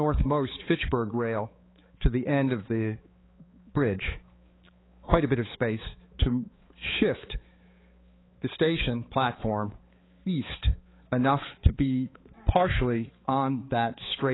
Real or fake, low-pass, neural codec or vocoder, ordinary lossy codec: fake; 7.2 kHz; codec, 16 kHz, 4 kbps, X-Codec, HuBERT features, trained on balanced general audio; AAC, 16 kbps